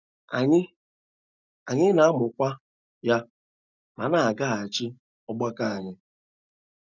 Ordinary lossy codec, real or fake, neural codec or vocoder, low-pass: none; real; none; 7.2 kHz